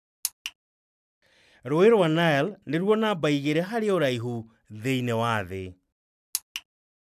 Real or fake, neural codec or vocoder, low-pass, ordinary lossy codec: real; none; 14.4 kHz; none